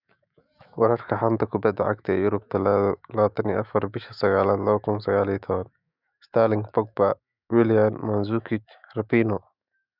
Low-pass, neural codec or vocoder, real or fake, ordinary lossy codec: 5.4 kHz; autoencoder, 48 kHz, 128 numbers a frame, DAC-VAE, trained on Japanese speech; fake; none